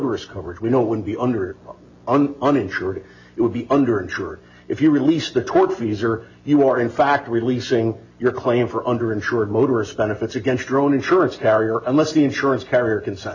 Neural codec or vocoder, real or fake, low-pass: none; real; 7.2 kHz